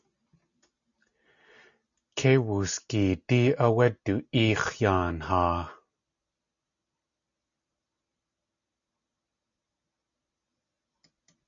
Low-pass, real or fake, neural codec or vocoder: 7.2 kHz; real; none